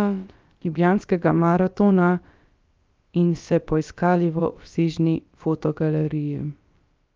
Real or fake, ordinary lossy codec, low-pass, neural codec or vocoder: fake; Opus, 24 kbps; 7.2 kHz; codec, 16 kHz, about 1 kbps, DyCAST, with the encoder's durations